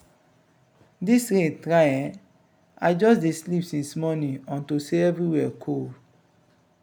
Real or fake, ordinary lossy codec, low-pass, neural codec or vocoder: real; none; none; none